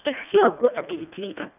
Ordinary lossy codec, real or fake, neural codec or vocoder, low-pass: none; fake; codec, 24 kHz, 1.5 kbps, HILCodec; 3.6 kHz